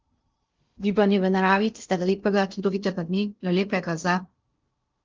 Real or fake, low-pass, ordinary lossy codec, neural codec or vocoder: fake; 7.2 kHz; Opus, 24 kbps; codec, 16 kHz in and 24 kHz out, 0.6 kbps, FocalCodec, streaming, 2048 codes